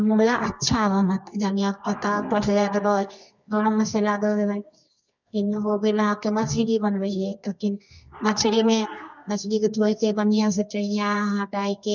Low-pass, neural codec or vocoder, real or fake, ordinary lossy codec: 7.2 kHz; codec, 24 kHz, 0.9 kbps, WavTokenizer, medium music audio release; fake; none